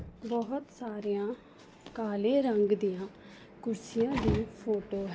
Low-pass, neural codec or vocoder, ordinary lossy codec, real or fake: none; none; none; real